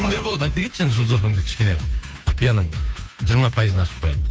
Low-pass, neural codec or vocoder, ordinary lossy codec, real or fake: none; codec, 16 kHz, 2 kbps, FunCodec, trained on Chinese and English, 25 frames a second; none; fake